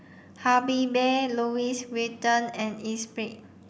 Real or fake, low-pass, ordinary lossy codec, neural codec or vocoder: real; none; none; none